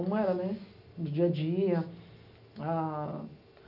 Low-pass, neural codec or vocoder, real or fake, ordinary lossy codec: 5.4 kHz; none; real; none